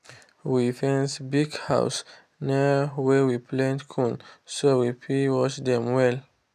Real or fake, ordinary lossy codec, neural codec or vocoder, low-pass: real; none; none; 14.4 kHz